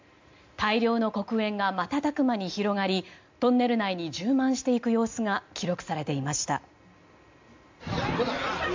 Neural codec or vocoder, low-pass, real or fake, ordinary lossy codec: none; 7.2 kHz; real; MP3, 64 kbps